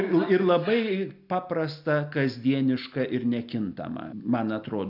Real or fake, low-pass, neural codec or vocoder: real; 5.4 kHz; none